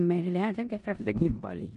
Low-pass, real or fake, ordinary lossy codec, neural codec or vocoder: 10.8 kHz; fake; none; codec, 16 kHz in and 24 kHz out, 0.9 kbps, LongCat-Audio-Codec, four codebook decoder